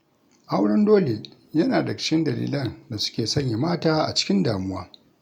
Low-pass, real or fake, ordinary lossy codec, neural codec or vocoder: 19.8 kHz; real; none; none